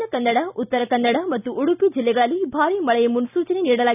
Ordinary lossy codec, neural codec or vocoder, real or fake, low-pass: none; none; real; 3.6 kHz